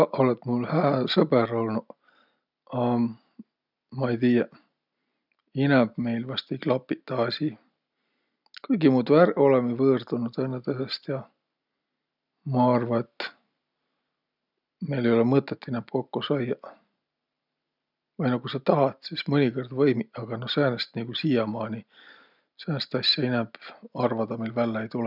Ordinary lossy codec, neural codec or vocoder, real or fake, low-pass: none; none; real; 5.4 kHz